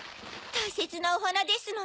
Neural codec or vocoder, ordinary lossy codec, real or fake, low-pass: none; none; real; none